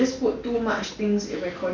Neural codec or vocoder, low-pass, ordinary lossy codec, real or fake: none; 7.2 kHz; none; real